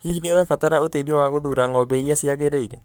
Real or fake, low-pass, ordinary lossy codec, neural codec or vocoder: fake; none; none; codec, 44.1 kHz, 3.4 kbps, Pupu-Codec